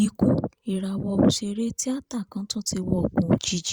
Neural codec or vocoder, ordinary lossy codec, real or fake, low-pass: vocoder, 44.1 kHz, 128 mel bands every 512 samples, BigVGAN v2; Opus, 64 kbps; fake; 19.8 kHz